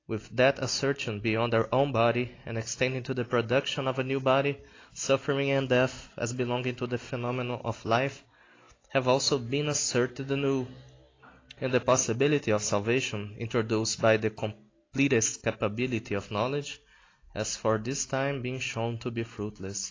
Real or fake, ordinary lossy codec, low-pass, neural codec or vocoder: real; AAC, 32 kbps; 7.2 kHz; none